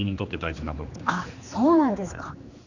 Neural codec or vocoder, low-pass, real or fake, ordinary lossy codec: codec, 16 kHz, 2 kbps, X-Codec, HuBERT features, trained on general audio; 7.2 kHz; fake; none